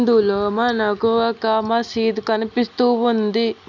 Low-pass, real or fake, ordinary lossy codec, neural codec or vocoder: 7.2 kHz; real; none; none